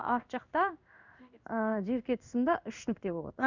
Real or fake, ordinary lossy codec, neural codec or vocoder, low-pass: fake; none; codec, 16 kHz in and 24 kHz out, 1 kbps, XY-Tokenizer; 7.2 kHz